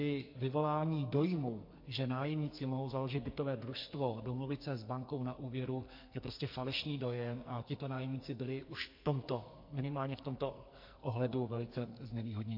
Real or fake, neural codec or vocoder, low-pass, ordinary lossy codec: fake; codec, 44.1 kHz, 2.6 kbps, SNAC; 5.4 kHz; MP3, 32 kbps